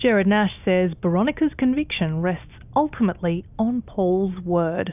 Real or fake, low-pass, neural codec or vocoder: real; 3.6 kHz; none